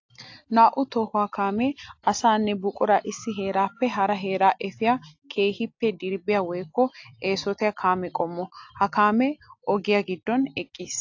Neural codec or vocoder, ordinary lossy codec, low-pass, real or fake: none; AAC, 48 kbps; 7.2 kHz; real